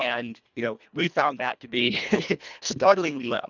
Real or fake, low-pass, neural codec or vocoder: fake; 7.2 kHz; codec, 24 kHz, 1.5 kbps, HILCodec